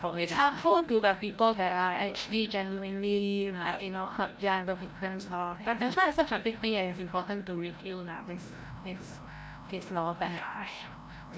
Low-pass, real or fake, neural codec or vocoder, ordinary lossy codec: none; fake; codec, 16 kHz, 0.5 kbps, FreqCodec, larger model; none